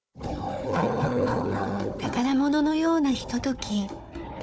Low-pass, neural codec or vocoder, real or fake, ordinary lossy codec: none; codec, 16 kHz, 4 kbps, FunCodec, trained on Chinese and English, 50 frames a second; fake; none